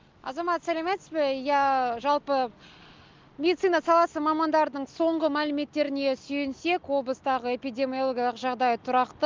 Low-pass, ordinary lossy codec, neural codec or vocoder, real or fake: 7.2 kHz; Opus, 32 kbps; none; real